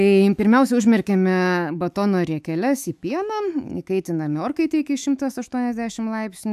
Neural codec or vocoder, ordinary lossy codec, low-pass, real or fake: autoencoder, 48 kHz, 128 numbers a frame, DAC-VAE, trained on Japanese speech; AAC, 96 kbps; 14.4 kHz; fake